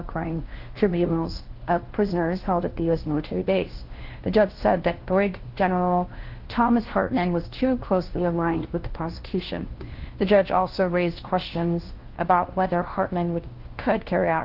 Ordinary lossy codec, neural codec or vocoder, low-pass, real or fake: Opus, 16 kbps; codec, 16 kHz, 1 kbps, FunCodec, trained on LibriTTS, 50 frames a second; 5.4 kHz; fake